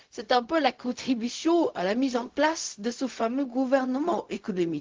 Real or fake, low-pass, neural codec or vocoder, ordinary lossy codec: fake; 7.2 kHz; codec, 16 kHz, 0.4 kbps, LongCat-Audio-Codec; Opus, 16 kbps